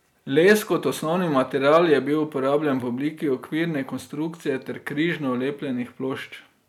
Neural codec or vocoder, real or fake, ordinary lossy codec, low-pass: none; real; none; 19.8 kHz